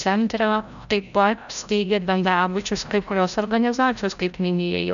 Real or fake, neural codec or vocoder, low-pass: fake; codec, 16 kHz, 0.5 kbps, FreqCodec, larger model; 7.2 kHz